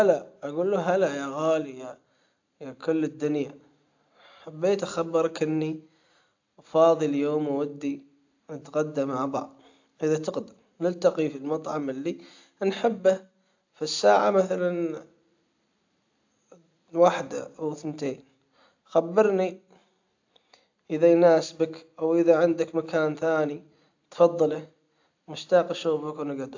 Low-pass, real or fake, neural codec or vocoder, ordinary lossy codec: 7.2 kHz; real; none; AAC, 48 kbps